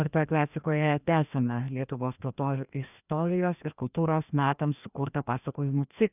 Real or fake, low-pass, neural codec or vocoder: fake; 3.6 kHz; codec, 16 kHz, 1 kbps, FreqCodec, larger model